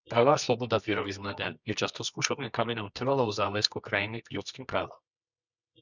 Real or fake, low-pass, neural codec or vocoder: fake; 7.2 kHz; codec, 24 kHz, 0.9 kbps, WavTokenizer, medium music audio release